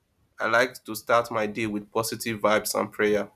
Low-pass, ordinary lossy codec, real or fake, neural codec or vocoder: 14.4 kHz; none; real; none